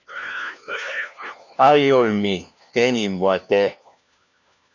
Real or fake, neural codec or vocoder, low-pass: fake; codec, 16 kHz, 1 kbps, FunCodec, trained on LibriTTS, 50 frames a second; 7.2 kHz